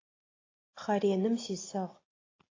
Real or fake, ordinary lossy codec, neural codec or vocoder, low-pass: real; AAC, 32 kbps; none; 7.2 kHz